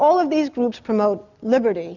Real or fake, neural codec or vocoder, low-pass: real; none; 7.2 kHz